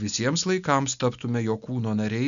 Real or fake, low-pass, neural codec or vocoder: real; 7.2 kHz; none